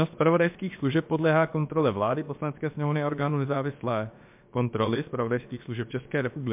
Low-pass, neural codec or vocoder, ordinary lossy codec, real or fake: 3.6 kHz; codec, 16 kHz, about 1 kbps, DyCAST, with the encoder's durations; MP3, 32 kbps; fake